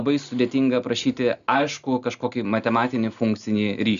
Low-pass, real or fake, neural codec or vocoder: 7.2 kHz; real; none